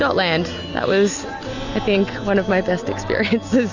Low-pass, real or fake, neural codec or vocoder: 7.2 kHz; real; none